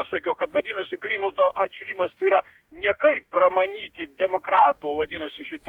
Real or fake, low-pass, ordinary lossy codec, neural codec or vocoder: fake; 19.8 kHz; Opus, 64 kbps; codec, 44.1 kHz, 2.6 kbps, DAC